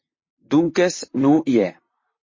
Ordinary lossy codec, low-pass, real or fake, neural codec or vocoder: MP3, 32 kbps; 7.2 kHz; fake; vocoder, 44.1 kHz, 128 mel bands, Pupu-Vocoder